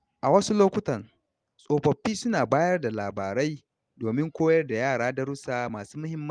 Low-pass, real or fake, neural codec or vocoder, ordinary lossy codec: 9.9 kHz; real; none; Opus, 32 kbps